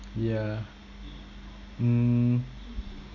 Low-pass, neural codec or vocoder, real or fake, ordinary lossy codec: 7.2 kHz; none; real; none